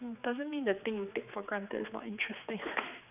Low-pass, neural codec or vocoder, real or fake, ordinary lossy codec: 3.6 kHz; codec, 16 kHz, 4 kbps, X-Codec, HuBERT features, trained on general audio; fake; none